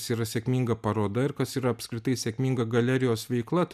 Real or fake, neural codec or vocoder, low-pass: real; none; 14.4 kHz